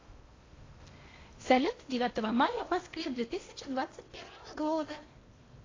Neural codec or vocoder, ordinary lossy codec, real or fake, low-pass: codec, 16 kHz in and 24 kHz out, 0.8 kbps, FocalCodec, streaming, 65536 codes; AAC, 32 kbps; fake; 7.2 kHz